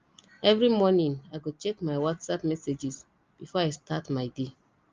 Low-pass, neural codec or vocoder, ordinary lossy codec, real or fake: 7.2 kHz; none; Opus, 32 kbps; real